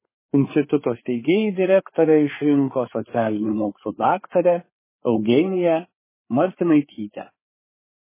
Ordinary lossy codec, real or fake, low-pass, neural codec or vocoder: MP3, 16 kbps; fake; 3.6 kHz; codec, 16 kHz, 4 kbps, FreqCodec, larger model